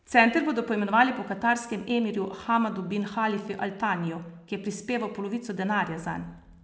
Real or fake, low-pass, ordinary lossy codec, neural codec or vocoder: real; none; none; none